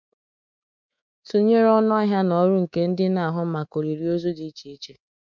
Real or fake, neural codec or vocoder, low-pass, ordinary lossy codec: fake; codec, 24 kHz, 3.1 kbps, DualCodec; 7.2 kHz; MP3, 64 kbps